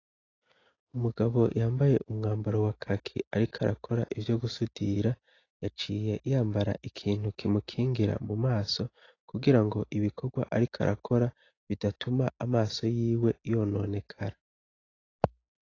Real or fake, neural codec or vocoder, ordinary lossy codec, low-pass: real; none; AAC, 32 kbps; 7.2 kHz